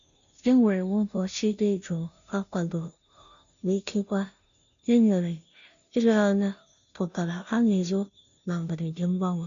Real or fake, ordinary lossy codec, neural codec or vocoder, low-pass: fake; none; codec, 16 kHz, 0.5 kbps, FunCodec, trained on Chinese and English, 25 frames a second; 7.2 kHz